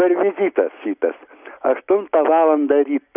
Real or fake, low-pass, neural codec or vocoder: real; 3.6 kHz; none